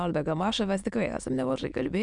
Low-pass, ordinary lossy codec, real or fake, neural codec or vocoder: 9.9 kHz; MP3, 96 kbps; fake; autoencoder, 22.05 kHz, a latent of 192 numbers a frame, VITS, trained on many speakers